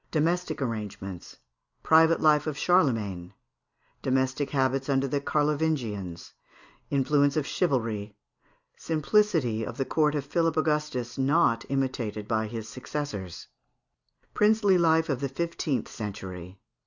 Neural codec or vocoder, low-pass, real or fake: none; 7.2 kHz; real